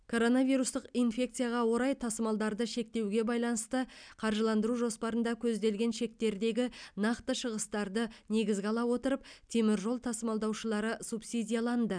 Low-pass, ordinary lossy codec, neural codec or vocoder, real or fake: 9.9 kHz; none; none; real